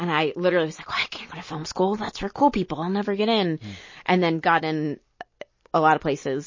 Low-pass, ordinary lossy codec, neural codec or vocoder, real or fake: 7.2 kHz; MP3, 32 kbps; none; real